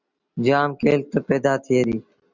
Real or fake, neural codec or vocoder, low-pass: real; none; 7.2 kHz